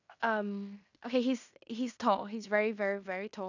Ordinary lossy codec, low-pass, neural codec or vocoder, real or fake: none; 7.2 kHz; codec, 16 kHz in and 24 kHz out, 0.9 kbps, LongCat-Audio-Codec, fine tuned four codebook decoder; fake